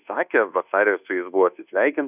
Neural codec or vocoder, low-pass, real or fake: codec, 24 kHz, 1.2 kbps, DualCodec; 3.6 kHz; fake